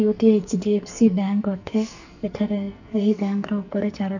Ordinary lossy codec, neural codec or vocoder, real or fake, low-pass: none; codec, 44.1 kHz, 2.6 kbps, SNAC; fake; 7.2 kHz